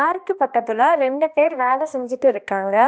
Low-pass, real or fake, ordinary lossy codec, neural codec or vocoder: none; fake; none; codec, 16 kHz, 1 kbps, X-Codec, HuBERT features, trained on balanced general audio